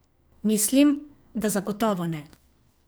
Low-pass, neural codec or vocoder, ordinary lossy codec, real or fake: none; codec, 44.1 kHz, 2.6 kbps, SNAC; none; fake